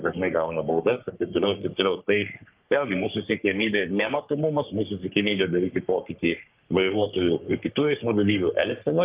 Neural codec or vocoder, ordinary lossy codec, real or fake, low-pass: codec, 44.1 kHz, 3.4 kbps, Pupu-Codec; Opus, 32 kbps; fake; 3.6 kHz